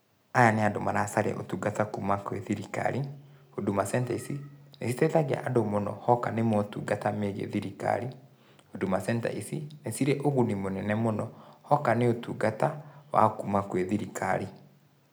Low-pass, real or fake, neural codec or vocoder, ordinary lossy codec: none; real; none; none